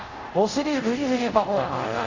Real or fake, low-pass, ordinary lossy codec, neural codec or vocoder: fake; 7.2 kHz; Opus, 64 kbps; codec, 24 kHz, 0.5 kbps, DualCodec